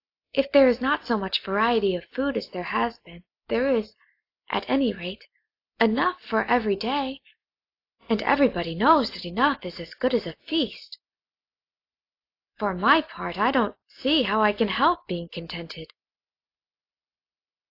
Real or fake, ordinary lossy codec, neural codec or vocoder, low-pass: real; AAC, 32 kbps; none; 5.4 kHz